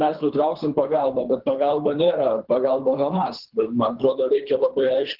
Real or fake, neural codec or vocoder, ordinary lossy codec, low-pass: fake; codec, 24 kHz, 3 kbps, HILCodec; Opus, 16 kbps; 5.4 kHz